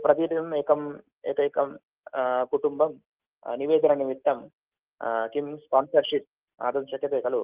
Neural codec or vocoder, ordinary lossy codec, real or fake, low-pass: none; Opus, 32 kbps; real; 3.6 kHz